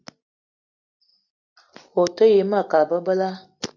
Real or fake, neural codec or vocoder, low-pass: real; none; 7.2 kHz